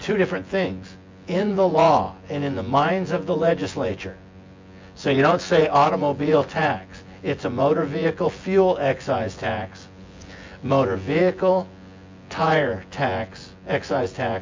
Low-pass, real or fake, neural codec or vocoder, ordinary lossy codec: 7.2 kHz; fake; vocoder, 24 kHz, 100 mel bands, Vocos; MP3, 48 kbps